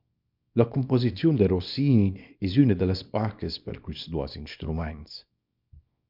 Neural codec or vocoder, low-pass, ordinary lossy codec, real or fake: codec, 24 kHz, 0.9 kbps, WavTokenizer, small release; 5.4 kHz; MP3, 48 kbps; fake